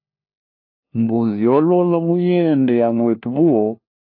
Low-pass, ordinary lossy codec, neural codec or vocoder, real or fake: 5.4 kHz; AAC, 32 kbps; codec, 16 kHz, 1 kbps, FunCodec, trained on LibriTTS, 50 frames a second; fake